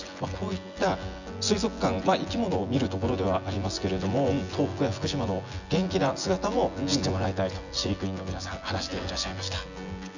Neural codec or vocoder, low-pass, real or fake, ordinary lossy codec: vocoder, 24 kHz, 100 mel bands, Vocos; 7.2 kHz; fake; none